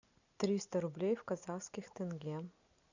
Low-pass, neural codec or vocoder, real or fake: 7.2 kHz; none; real